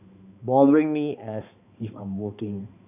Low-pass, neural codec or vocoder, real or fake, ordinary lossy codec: 3.6 kHz; codec, 16 kHz, 2 kbps, X-Codec, HuBERT features, trained on balanced general audio; fake; none